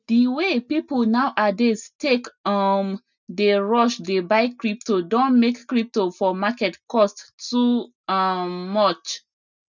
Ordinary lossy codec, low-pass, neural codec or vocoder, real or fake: none; 7.2 kHz; none; real